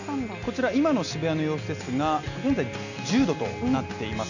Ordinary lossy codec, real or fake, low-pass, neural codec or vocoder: none; real; 7.2 kHz; none